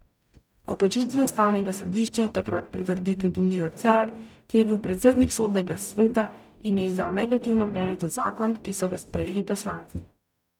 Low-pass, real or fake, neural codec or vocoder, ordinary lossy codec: 19.8 kHz; fake; codec, 44.1 kHz, 0.9 kbps, DAC; none